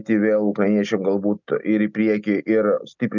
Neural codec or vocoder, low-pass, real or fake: none; 7.2 kHz; real